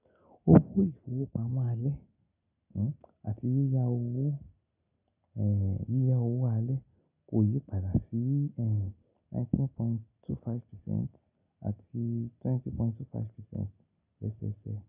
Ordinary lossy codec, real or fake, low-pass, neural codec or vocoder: none; real; 3.6 kHz; none